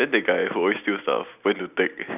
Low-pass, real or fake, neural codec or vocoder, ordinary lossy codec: 3.6 kHz; real; none; none